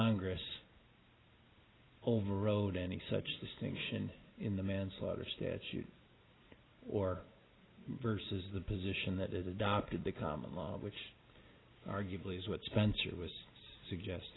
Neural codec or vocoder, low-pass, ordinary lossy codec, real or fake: none; 7.2 kHz; AAC, 16 kbps; real